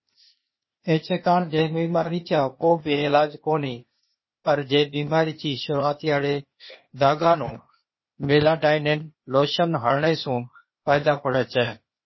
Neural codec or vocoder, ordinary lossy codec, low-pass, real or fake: codec, 16 kHz, 0.8 kbps, ZipCodec; MP3, 24 kbps; 7.2 kHz; fake